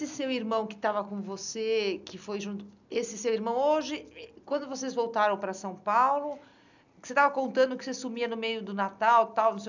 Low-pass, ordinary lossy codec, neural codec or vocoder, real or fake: 7.2 kHz; none; none; real